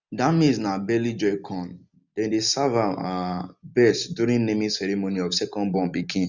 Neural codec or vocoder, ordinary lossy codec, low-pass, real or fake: none; none; 7.2 kHz; real